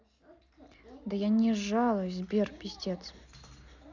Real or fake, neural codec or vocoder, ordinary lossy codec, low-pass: real; none; none; 7.2 kHz